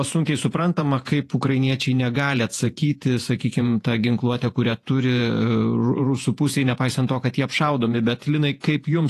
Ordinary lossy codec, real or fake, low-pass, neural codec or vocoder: AAC, 48 kbps; fake; 14.4 kHz; vocoder, 48 kHz, 128 mel bands, Vocos